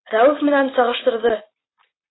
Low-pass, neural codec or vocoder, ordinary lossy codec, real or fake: 7.2 kHz; none; AAC, 16 kbps; real